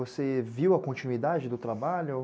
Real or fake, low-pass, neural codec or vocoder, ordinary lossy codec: real; none; none; none